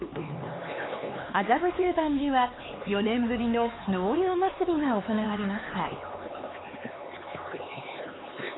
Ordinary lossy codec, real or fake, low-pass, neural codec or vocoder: AAC, 16 kbps; fake; 7.2 kHz; codec, 16 kHz, 4 kbps, X-Codec, HuBERT features, trained on LibriSpeech